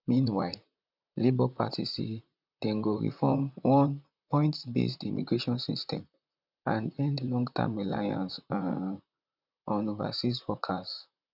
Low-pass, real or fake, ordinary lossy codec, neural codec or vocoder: 5.4 kHz; fake; none; vocoder, 44.1 kHz, 128 mel bands, Pupu-Vocoder